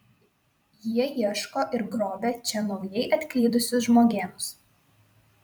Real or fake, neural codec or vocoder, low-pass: fake; vocoder, 44.1 kHz, 128 mel bands every 256 samples, BigVGAN v2; 19.8 kHz